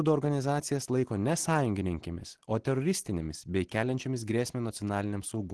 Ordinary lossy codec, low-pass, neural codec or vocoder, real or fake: Opus, 16 kbps; 10.8 kHz; none; real